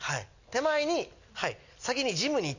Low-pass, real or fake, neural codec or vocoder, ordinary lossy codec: 7.2 kHz; real; none; none